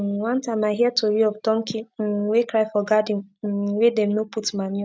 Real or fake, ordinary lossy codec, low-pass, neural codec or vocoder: real; none; none; none